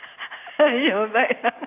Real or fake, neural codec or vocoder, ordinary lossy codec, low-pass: real; none; AAC, 24 kbps; 3.6 kHz